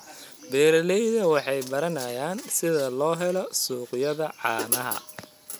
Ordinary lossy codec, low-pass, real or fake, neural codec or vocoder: none; 19.8 kHz; real; none